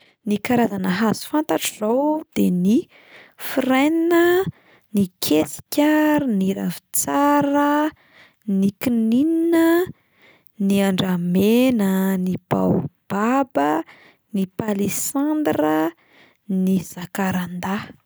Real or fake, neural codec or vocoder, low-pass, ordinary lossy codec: real; none; none; none